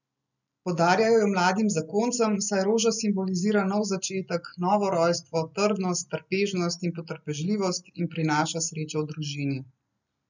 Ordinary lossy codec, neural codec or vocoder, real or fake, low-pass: none; none; real; 7.2 kHz